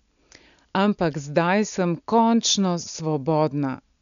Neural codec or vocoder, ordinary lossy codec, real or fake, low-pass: none; none; real; 7.2 kHz